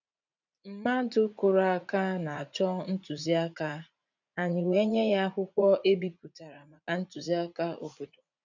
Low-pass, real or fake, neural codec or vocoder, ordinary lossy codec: 7.2 kHz; fake; vocoder, 44.1 kHz, 80 mel bands, Vocos; none